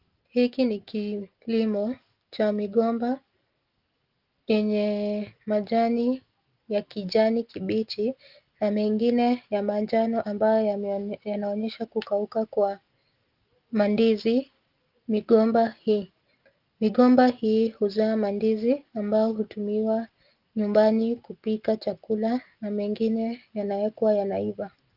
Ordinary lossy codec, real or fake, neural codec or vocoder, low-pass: Opus, 32 kbps; real; none; 5.4 kHz